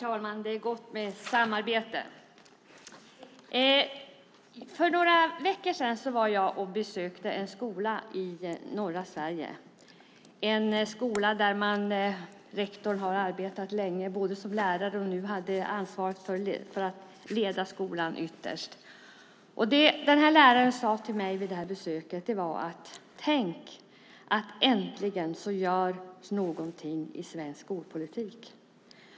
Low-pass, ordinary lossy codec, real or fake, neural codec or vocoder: none; none; real; none